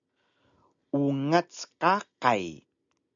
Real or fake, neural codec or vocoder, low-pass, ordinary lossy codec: real; none; 7.2 kHz; MP3, 96 kbps